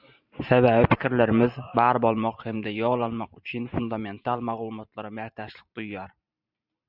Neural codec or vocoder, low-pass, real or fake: vocoder, 44.1 kHz, 128 mel bands every 512 samples, BigVGAN v2; 5.4 kHz; fake